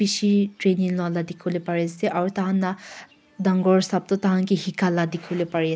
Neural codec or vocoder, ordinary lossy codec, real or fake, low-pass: none; none; real; none